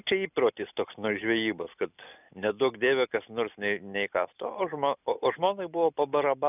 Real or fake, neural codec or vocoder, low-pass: real; none; 3.6 kHz